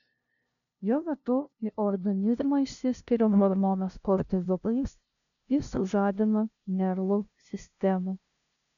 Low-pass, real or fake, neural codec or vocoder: 7.2 kHz; fake; codec, 16 kHz, 0.5 kbps, FunCodec, trained on LibriTTS, 25 frames a second